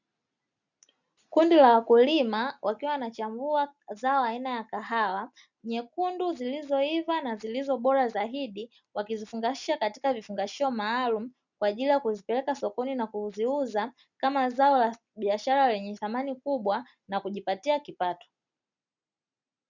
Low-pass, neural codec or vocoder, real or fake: 7.2 kHz; none; real